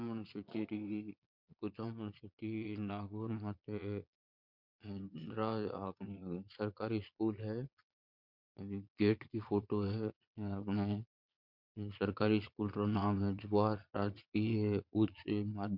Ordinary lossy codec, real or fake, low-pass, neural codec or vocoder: MP3, 48 kbps; fake; 5.4 kHz; vocoder, 22.05 kHz, 80 mel bands, Vocos